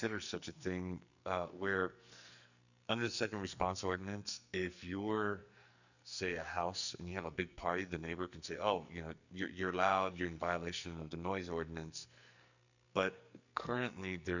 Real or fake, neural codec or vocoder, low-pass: fake; codec, 44.1 kHz, 2.6 kbps, SNAC; 7.2 kHz